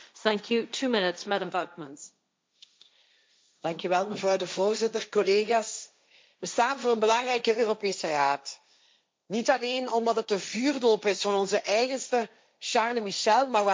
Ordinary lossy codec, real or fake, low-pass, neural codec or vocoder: none; fake; none; codec, 16 kHz, 1.1 kbps, Voila-Tokenizer